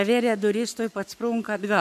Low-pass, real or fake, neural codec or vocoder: 14.4 kHz; fake; codec, 44.1 kHz, 7.8 kbps, Pupu-Codec